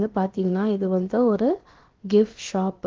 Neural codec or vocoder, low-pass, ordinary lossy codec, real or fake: codec, 16 kHz, about 1 kbps, DyCAST, with the encoder's durations; 7.2 kHz; Opus, 16 kbps; fake